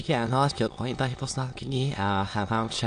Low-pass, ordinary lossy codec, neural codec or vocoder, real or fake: 9.9 kHz; AAC, 64 kbps; autoencoder, 22.05 kHz, a latent of 192 numbers a frame, VITS, trained on many speakers; fake